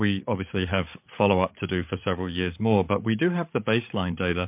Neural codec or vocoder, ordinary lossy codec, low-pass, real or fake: codec, 16 kHz, 8 kbps, FreqCodec, larger model; MP3, 32 kbps; 3.6 kHz; fake